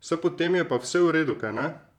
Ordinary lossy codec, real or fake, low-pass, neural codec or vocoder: none; fake; 19.8 kHz; vocoder, 44.1 kHz, 128 mel bands, Pupu-Vocoder